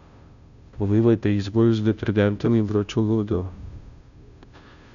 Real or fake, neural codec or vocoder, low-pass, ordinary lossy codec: fake; codec, 16 kHz, 0.5 kbps, FunCodec, trained on Chinese and English, 25 frames a second; 7.2 kHz; none